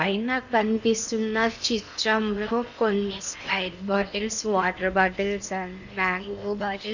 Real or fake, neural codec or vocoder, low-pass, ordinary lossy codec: fake; codec, 16 kHz in and 24 kHz out, 0.8 kbps, FocalCodec, streaming, 65536 codes; 7.2 kHz; none